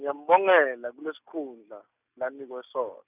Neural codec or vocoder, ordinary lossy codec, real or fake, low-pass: none; none; real; 3.6 kHz